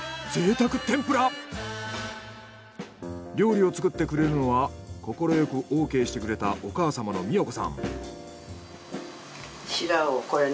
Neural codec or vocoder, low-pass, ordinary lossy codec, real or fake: none; none; none; real